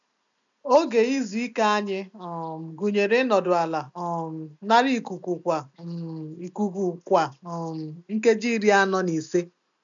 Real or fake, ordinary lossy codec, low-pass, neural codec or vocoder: real; none; 7.2 kHz; none